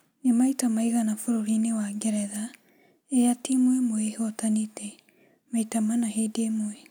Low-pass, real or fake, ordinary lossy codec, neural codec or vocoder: none; real; none; none